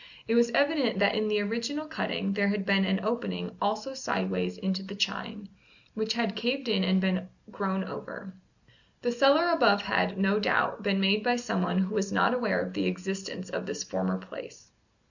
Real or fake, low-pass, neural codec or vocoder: real; 7.2 kHz; none